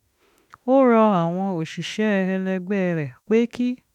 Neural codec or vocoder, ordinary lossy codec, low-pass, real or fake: autoencoder, 48 kHz, 32 numbers a frame, DAC-VAE, trained on Japanese speech; none; 19.8 kHz; fake